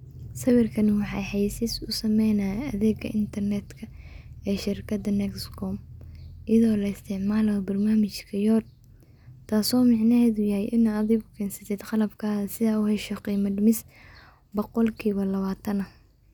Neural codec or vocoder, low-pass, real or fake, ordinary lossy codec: none; 19.8 kHz; real; none